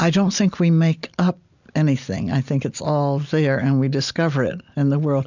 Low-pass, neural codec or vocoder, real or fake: 7.2 kHz; none; real